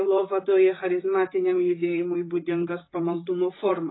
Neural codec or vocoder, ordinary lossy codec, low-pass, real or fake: vocoder, 44.1 kHz, 128 mel bands, Pupu-Vocoder; AAC, 16 kbps; 7.2 kHz; fake